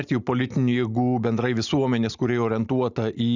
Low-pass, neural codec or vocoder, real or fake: 7.2 kHz; none; real